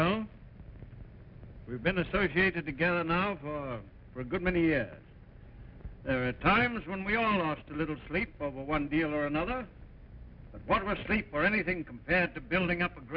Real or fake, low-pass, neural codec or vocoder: real; 5.4 kHz; none